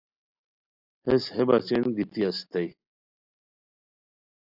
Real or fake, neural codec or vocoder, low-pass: real; none; 5.4 kHz